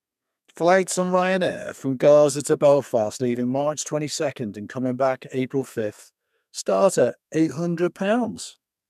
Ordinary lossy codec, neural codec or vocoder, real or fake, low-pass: none; codec, 32 kHz, 1.9 kbps, SNAC; fake; 14.4 kHz